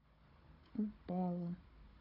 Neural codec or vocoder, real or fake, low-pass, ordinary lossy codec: codec, 16 kHz, 16 kbps, FunCodec, trained on Chinese and English, 50 frames a second; fake; 5.4 kHz; AAC, 24 kbps